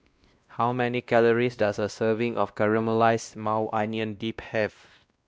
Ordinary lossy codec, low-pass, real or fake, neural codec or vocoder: none; none; fake; codec, 16 kHz, 1 kbps, X-Codec, WavLM features, trained on Multilingual LibriSpeech